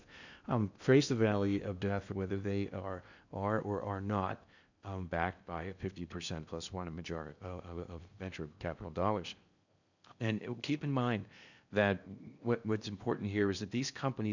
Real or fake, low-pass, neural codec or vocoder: fake; 7.2 kHz; codec, 16 kHz in and 24 kHz out, 0.8 kbps, FocalCodec, streaming, 65536 codes